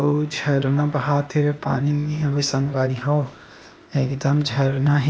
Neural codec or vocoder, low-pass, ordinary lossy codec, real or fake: codec, 16 kHz, 0.8 kbps, ZipCodec; none; none; fake